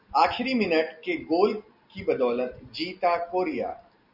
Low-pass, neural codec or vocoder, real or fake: 5.4 kHz; none; real